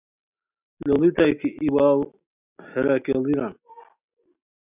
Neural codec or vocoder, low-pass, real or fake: none; 3.6 kHz; real